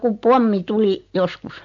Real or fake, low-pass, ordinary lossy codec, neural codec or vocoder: real; 7.2 kHz; MP3, 48 kbps; none